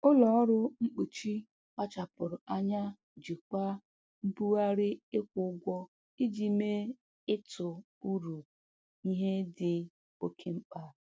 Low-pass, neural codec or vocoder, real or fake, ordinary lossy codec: none; none; real; none